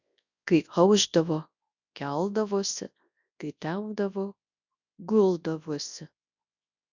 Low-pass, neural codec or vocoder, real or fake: 7.2 kHz; codec, 24 kHz, 0.9 kbps, WavTokenizer, large speech release; fake